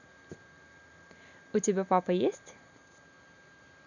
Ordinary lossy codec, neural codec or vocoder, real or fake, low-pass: none; none; real; 7.2 kHz